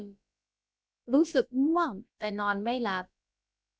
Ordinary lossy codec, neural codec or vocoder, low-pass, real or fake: none; codec, 16 kHz, about 1 kbps, DyCAST, with the encoder's durations; none; fake